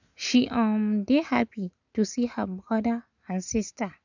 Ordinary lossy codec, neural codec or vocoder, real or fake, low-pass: none; none; real; 7.2 kHz